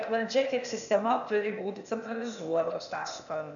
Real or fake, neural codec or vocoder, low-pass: fake; codec, 16 kHz, 0.8 kbps, ZipCodec; 7.2 kHz